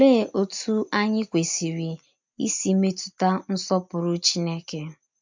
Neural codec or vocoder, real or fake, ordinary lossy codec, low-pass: none; real; MP3, 64 kbps; 7.2 kHz